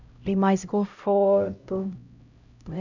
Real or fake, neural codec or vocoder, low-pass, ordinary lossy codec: fake; codec, 16 kHz, 0.5 kbps, X-Codec, HuBERT features, trained on LibriSpeech; 7.2 kHz; none